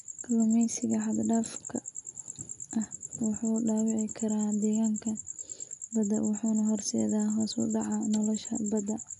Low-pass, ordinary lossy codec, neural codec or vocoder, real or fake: 10.8 kHz; none; none; real